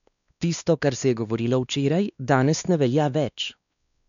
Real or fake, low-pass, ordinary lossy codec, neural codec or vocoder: fake; 7.2 kHz; none; codec, 16 kHz, 2 kbps, X-Codec, WavLM features, trained on Multilingual LibriSpeech